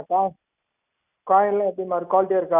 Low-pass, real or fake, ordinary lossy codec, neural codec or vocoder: 3.6 kHz; real; none; none